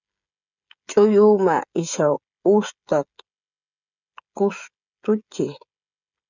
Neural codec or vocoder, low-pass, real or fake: codec, 16 kHz, 16 kbps, FreqCodec, smaller model; 7.2 kHz; fake